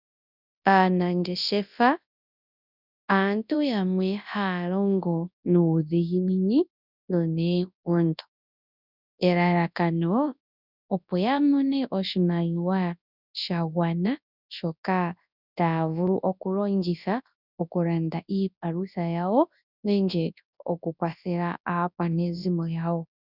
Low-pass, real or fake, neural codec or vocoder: 5.4 kHz; fake; codec, 24 kHz, 0.9 kbps, WavTokenizer, large speech release